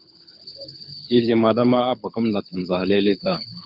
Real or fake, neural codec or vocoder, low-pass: fake; codec, 24 kHz, 6 kbps, HILCodec; 5.4 kHz